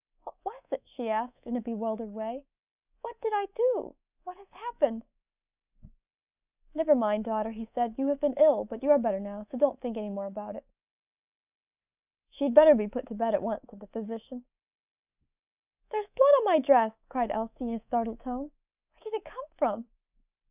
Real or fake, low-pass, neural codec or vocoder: fake; 3.6 kHz; codec, 24 kHz, 3.1 kbps, DualCodec